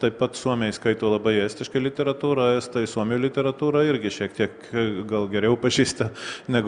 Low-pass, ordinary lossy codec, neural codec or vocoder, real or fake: 9.9 kHz; Opus, 64 kbps; none; real